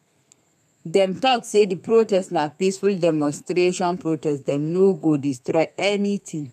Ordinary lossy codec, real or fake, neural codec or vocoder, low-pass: none; fake; codec, 32 kHz, 1.9 kbps, SNAC; 14.4 kHz